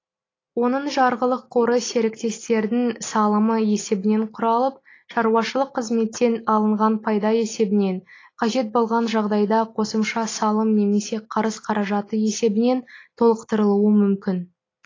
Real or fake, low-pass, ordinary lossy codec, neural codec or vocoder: real; 7.2 kHz; AAC, 32 kbps; none